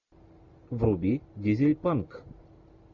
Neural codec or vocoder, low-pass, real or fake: none; 7.2 kHz; real